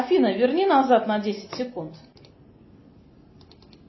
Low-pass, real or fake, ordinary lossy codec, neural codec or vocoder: 7.2 kHz; real; MP3, 24 kbps; none